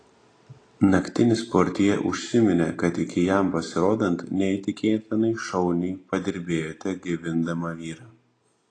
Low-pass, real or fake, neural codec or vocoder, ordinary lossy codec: 9.9 kHz; real; none; AAC, 32 kbps